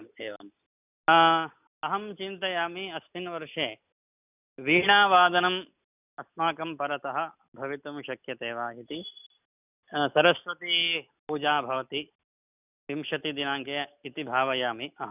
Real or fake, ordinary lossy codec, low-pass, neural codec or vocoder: real; none; 3.6 kHz; none